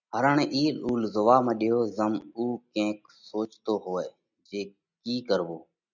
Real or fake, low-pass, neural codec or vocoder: real; 7.2 kHz; none